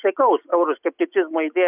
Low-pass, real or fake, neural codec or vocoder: 3.6 kHz; real; none